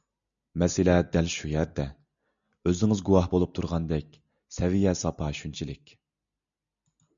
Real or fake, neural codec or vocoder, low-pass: real; none; 7.2 kHz